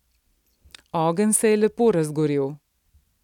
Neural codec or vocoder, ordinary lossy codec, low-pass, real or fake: none; none; 19.8 kHz; real